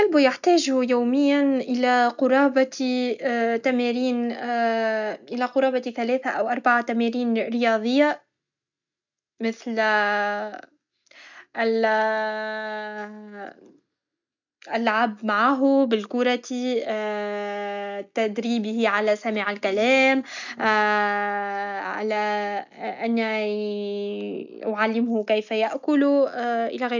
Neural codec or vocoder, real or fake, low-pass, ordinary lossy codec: none; real; 7.2 kHz; none